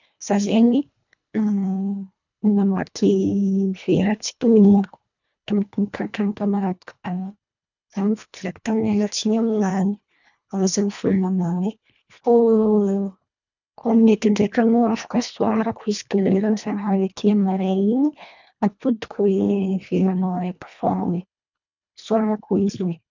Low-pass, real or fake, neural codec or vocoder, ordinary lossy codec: 7.2 kHz; fake; codec, 24 kHz, 1.5 kbps, HILCodec; none